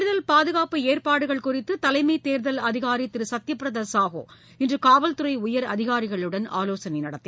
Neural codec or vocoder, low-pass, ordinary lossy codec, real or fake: none; none; none; real